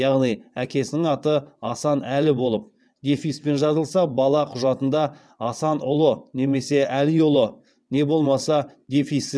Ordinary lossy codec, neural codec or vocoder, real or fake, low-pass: none; vocoder, 22.05 kHz, 80 mel bands, WaveNeXt; fake; none